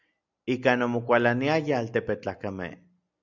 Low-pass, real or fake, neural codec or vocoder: 7.2 kHz; real; none